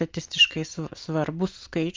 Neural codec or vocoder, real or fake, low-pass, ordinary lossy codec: none; real; 7.2 kHz; Opus, 32 kbps